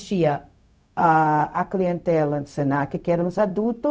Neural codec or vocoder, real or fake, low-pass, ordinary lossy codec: codec, 16 kHz, 0.4 kbps, LongCat-Audio-Codec; fake; none; none